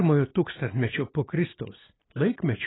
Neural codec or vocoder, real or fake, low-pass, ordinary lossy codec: none; real; 7.2 kHz; AAC, 16 kbps